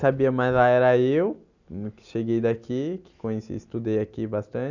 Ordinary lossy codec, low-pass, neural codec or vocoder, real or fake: none; 7.2 kHz; none; real